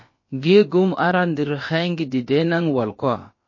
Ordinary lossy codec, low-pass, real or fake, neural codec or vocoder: MP3, 32 kbps; 7.2 kHz; fake; codec, 16 kHz, about 1 kbps, DyCAST, with the encoder's durations